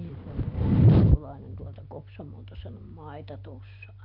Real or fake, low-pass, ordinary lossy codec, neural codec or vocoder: real; 5.4 kHz; none; none